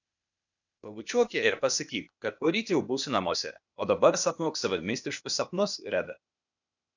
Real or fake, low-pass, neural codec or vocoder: fake; 7.2 kHz; codec, 16 kHz, 0.8 kbps, ZipCodec